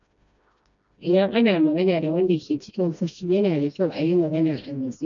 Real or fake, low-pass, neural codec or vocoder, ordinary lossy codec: fake; 7.2 kHz; codec, 16 kHz, 1 kbps, FreqCodec, smaller model; none